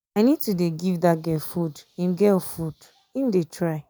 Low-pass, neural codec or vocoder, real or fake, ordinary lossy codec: none; none; real; none